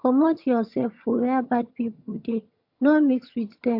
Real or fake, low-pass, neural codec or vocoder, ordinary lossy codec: fake; 5.4 kHz; vocoder, 22.05 kHz, 80 mel bands, HiFi-GAN; AAC, 48 kbps